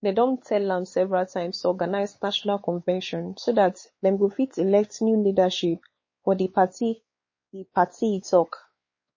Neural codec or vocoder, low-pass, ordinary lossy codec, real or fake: codec, 16 kHz, 4 kbps, X-Codec, WavLM features, trained on Multilingual LibriSpeech; 7.2 kHz; MP3, 32 kbps; fake